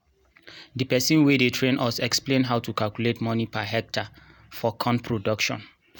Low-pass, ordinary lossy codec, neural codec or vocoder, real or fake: none; none; none; real